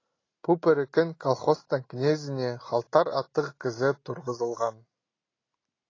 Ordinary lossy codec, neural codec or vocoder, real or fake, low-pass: AAC, 32 kbps; none; real; 7.2 kHz